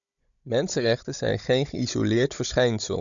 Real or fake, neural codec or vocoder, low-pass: fake; codec, 16 kHz, 16 kbps, FunCodec, trained on Chinese and English, 50 frames a second; 7.2 kHz